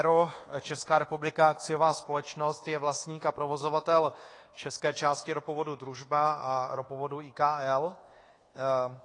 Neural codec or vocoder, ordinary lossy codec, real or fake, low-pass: codec, 24 kHz, 1.2 kbps, DualCodec; AAC, 32 kbps; fake; 10.8 kHz